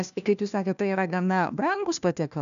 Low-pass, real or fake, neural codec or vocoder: 7.2 kHz; fake; codec, 16 kHz, 1 kbps, FunCodec, trained on LibriTTS, 50 frames a second